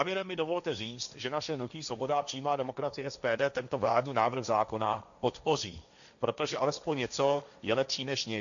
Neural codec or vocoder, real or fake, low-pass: codec, 16 kHz, 1.1 kbps, Voila-Tokenizer; fake; 7.2 kHz